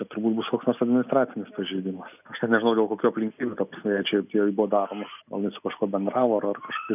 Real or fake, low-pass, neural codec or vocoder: real; 3.6 kHz; none